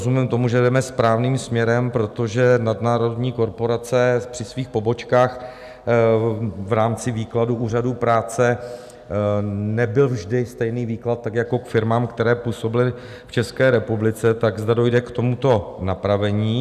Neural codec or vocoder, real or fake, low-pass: none; real; 14.4 kHz